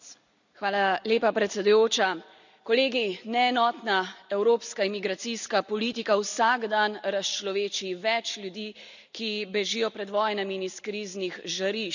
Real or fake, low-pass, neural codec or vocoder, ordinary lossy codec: real; 7.2 kHz; none; none